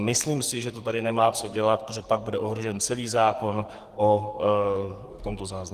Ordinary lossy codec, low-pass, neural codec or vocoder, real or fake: Opus, 24 kbps; 14.4 kHz; codec, 44.1 kHz, 2.6 kbps, SNAC; fake